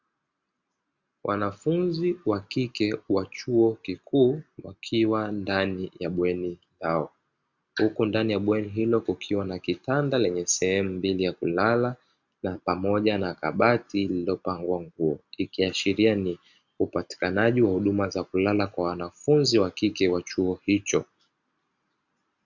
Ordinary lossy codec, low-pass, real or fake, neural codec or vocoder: Opus, 64 kbps; 7.2 kHz; real; none